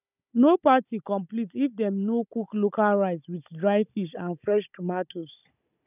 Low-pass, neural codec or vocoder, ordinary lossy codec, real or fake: 3.6 kHz; codec, 16 kHz, 16 kbps, FunCodec, trained on Chinese and English, 50 frames a second; none; fake